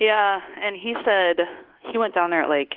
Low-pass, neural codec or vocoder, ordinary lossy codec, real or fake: 5.4 kHz; codec, 24 kHz, 1.2 kbps, DualCodec; Opus, 16 kbps; fake